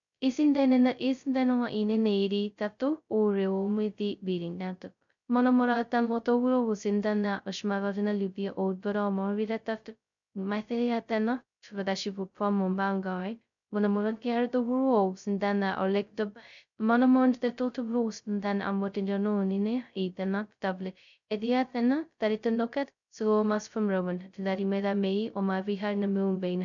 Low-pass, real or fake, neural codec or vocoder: 7.2 kHz; fake; codec, 16 kHz, 0.2 kbps, FocalCodec